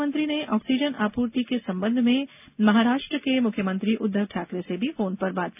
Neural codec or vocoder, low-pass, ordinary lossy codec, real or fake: none; 3.6 kHz; none; real